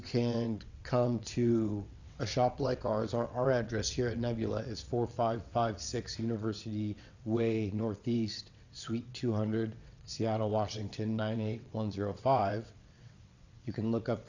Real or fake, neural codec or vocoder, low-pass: fake; vocoder, 22.05 kHz, 80 mel bands, WaveNeXt; 7.2 kHz